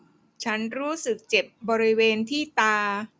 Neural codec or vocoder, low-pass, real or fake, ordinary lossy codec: none; none; real; none